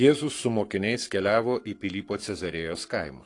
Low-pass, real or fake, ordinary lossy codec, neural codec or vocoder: 10.8 kHz; fake; AAC, 48 kbps; codec, 44.1 kHz, 7.8 kbps, Pupu-Codec